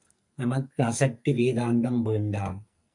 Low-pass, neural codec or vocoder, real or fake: 10.8 kHz; codec, 44.1 kHz, 2.6 kbps, SNAC; fake